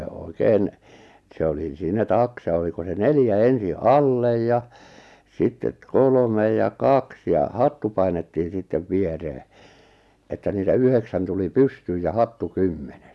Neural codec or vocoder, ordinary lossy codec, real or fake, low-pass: none; none; real; none